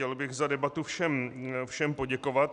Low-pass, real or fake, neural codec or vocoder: 10.8 kHz; real; none